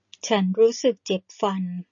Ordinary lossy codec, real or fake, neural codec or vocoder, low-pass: MP3, 32 kbps; real; none; 7.2 kHz